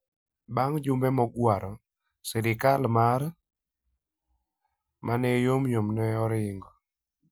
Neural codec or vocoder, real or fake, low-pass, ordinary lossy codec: none; real; none; none